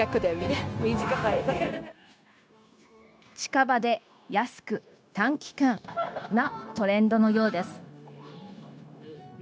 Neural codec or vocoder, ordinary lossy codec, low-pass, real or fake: codec, 16 kHz, 0.9 kbps, LongCat-Audio-Codec; none; none; fake